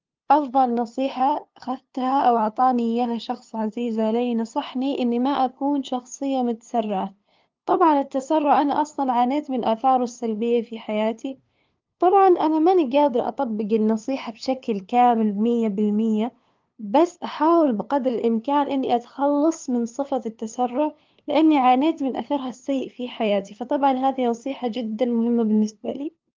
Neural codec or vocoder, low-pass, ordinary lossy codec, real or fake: codec, 16 kHz, 2 kbps, FunCodec, trained on LibriTTS, 25 frames a second; 7.2 kHz; Opus, 24 kbps; fake